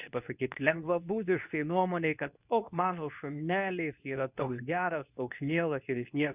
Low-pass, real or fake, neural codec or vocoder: 3.6 kHz; fake; codec, 24 kHz, 0.9 kbps, WavTokenizer, medium speech release version 2